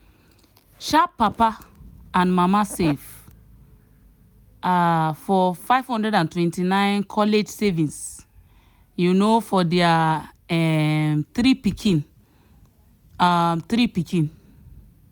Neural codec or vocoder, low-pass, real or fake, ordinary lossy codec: none; none; real; none